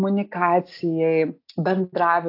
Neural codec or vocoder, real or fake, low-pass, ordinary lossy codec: none; real; 5.4 kHz; AAC, 32 kbps